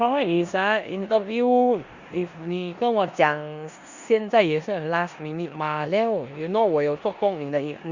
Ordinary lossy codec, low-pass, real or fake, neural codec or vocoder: Opus, 64 kbps; 7.2 kHz; fake; codec, 16 kHz in and 24 kHz out, 0.9 kbps, LongCat-Audio-Codec, four codebook decoder